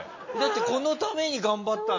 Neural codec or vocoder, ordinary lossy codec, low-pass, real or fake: none; MP3, 32 kbps; 7.2 kHz; real